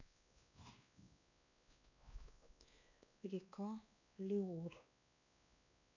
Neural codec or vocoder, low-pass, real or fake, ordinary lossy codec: codec, 16 kHz, 2 kbps, X-Codec, WavLM features, trained on Multilingual LibriSpeech; 7.2 kHz; fake; none